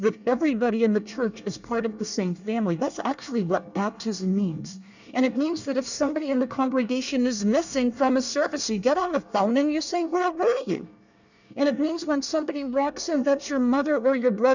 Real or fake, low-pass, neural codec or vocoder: fake; 7.2 kHz; codec, 24 kHz, 1 kbps, SNAC